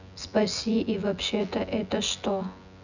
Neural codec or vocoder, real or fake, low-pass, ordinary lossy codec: vocoder, 24 kHz, 100 mel bands, Vocos; fake; 7.2 kHz; none